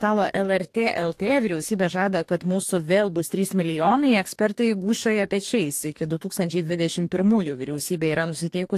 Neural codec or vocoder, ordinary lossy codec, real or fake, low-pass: codec, 44.1 kHz, 2.6 kbps, DAC; AAC, 64 kbps; fake; 14.4 kHz